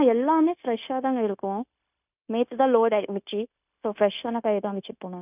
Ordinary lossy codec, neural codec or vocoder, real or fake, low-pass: none; codec, 16 kHz, 0.9 kbps, LongCat-Audio-Codec; fake; 3.6 kHz